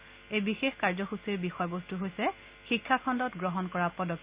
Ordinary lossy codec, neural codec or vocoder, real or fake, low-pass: Opus, 64 kbps; none; real; 3.6 kHz